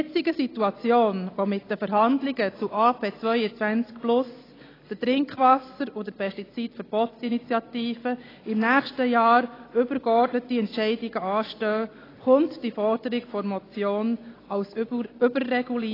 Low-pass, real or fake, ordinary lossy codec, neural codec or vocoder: 5.4 kHz; real; AAC, 24 kbps; none